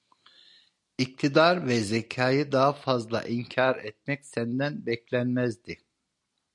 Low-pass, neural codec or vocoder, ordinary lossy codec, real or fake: 10.8 kHz; none; MP3, 96 kbps; real